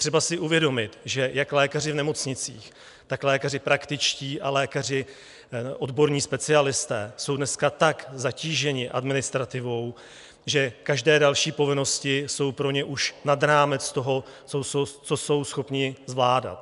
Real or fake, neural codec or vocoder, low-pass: real; none; 10.8 kHz